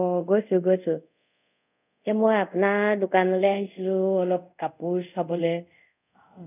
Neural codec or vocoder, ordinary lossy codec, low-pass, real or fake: codec, 24 kHz, 0.5 kbps, DualCodec; none; 3.6 kHz; fake